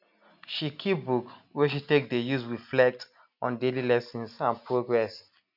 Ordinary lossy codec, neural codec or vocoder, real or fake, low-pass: none; none; real; 5.4 kHz